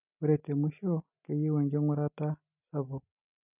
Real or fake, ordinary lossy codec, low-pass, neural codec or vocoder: real; none; 3.6 kHz; none